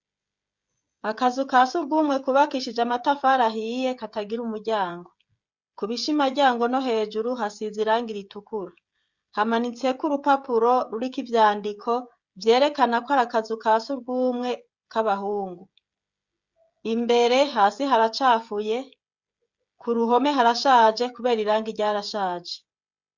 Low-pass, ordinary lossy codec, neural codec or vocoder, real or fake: 7.2 kHz; Opus, 64 kbps; codec, 16 kHz, 16 kbps, FreqCodec, smaller model; fake